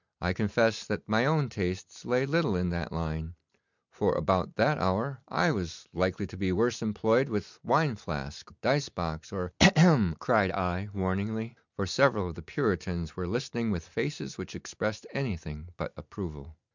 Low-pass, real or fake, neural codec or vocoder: 7.2 kHz; real; none